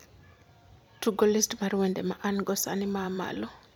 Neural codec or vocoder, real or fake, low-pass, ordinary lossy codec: vocoder, 44.1 kHz, 128 mel bands every 256 samples, BigVGAN v2; fake; none; none